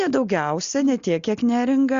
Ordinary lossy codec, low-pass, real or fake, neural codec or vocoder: Opus, 64 kbps; 7.2 kHz; real; none